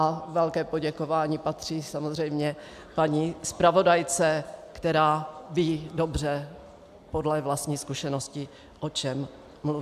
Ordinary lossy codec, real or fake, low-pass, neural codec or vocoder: AAC, 96 kbps; real; 14.4 kHz; none